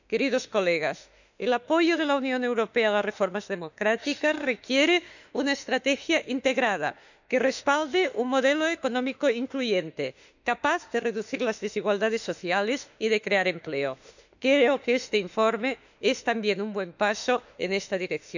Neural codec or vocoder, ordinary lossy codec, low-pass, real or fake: autoencoder, 48 kHz, 32 numbers a frame, DAC-VAE, trained on Japanese speech; none; 7.2 kHz; fake